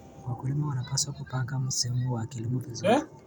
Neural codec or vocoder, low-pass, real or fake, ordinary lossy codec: vocoder, 44.1 kHz, 128 mel bands every 512 samples, BigVGAN v2; none; fake; none